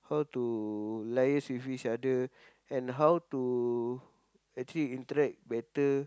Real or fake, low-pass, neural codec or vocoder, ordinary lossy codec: real; none; none; none